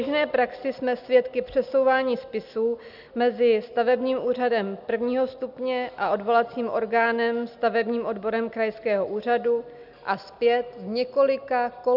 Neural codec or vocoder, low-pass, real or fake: none; 5.4 kHz; real